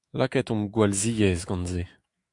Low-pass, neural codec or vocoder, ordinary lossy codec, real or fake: 10.8 kHz; autoencoder, 48 kHz, 128 numbers a frame, DAC-VAE, trained on Japanese speech; Opus, 64 kbps; fake